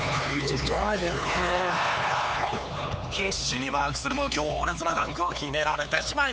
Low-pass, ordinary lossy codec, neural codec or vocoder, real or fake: none; none; codec, 16 kHz, 4 kbps, X-Codec, HuBERT features, trained on LibriSpeech; fake